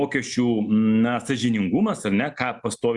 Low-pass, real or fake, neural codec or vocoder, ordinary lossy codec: 10.8 kHz; real; none; Opus, 64 kbps